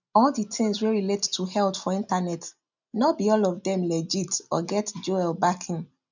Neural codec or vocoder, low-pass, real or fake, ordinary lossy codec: none; 7.2 kHz; real; none